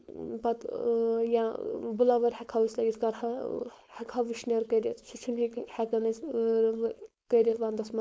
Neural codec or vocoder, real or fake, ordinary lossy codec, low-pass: codec, 16 kHz, 4.8 kbps, FACodec; fake; none; none